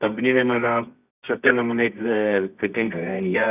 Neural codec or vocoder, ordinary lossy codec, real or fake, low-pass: codec, 24 kHz, 0.9 kbps, WavTokenizer, medium music audio release; none; fake; 3.6 kHz